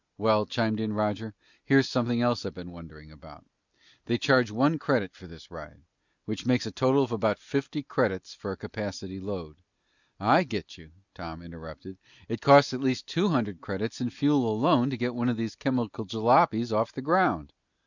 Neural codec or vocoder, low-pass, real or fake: none; 7.2 kHz; real